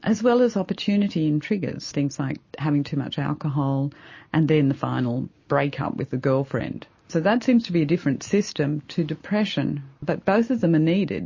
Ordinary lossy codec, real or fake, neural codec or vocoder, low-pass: MP3, 32 kbps; real; none; 7.2 kHz